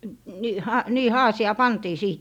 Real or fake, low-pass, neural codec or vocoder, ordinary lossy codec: real; 19.8 kHz; none; none